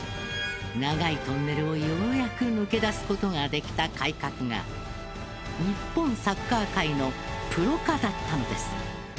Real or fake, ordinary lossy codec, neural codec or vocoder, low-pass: real; none; none; none